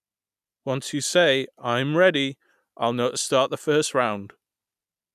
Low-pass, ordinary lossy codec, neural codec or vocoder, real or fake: 14.4 kHz; none; none; real